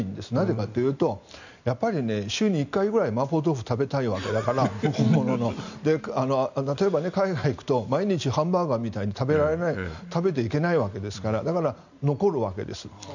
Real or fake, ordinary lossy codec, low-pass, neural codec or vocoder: real; none; 7.2 kHz; none